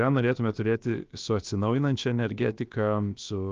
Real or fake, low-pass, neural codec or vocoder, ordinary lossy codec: fake; 7.2 kHz; codec, 16 kHz, about 1 kbps, DyCAST, with the encoder's durations; Opus, 24 kbps